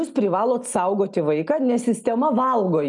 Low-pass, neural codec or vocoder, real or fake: 10.8 kHz; none; real